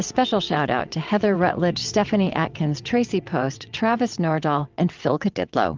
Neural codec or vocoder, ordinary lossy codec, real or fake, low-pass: vocoder, 22.05 kHz, 80 mel bands, WaveNeXt; Opus, 24 kbps; fake; 7.2 kHz